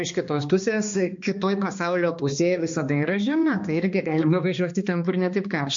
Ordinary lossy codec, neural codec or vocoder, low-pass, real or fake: MP3, 48 kbps; codec, 16 kHz, 2 kbps, X-Codec, HuBERT features, trained on balanced general audio; 7.2 kHz; fake